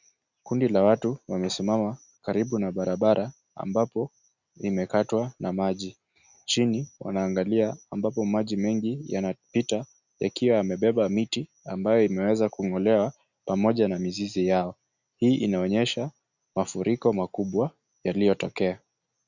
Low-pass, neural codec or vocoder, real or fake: 7.2 kHz; none; real